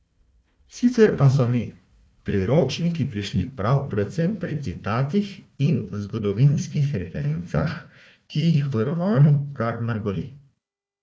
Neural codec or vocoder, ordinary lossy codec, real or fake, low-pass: codec, 16 kHz, 1 kbps, FunCodec, trained on Chinese and English, 50 frames a second; none; fake; none